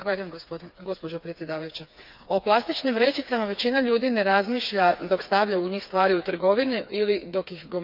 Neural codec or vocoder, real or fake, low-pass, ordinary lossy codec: codec, 16 kHz, 4 kbps, FreqCodec, smaller model; fake; 5.4 kHz; none